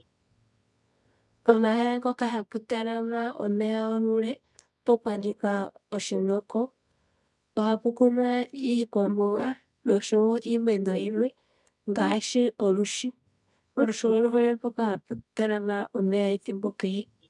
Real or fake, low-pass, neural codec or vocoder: fake; 10.8 kHz; codec, 24 kHz, 0.9 kbps, WavTokenizer, medium music audio release